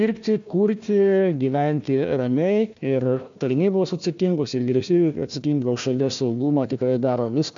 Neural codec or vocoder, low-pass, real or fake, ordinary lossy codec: codec, 16 kHz, 1 kbps, FunCodec, trained on Chinese and English, 50 frames a second; 7.2 kHz; fake; MP3, 64 kbps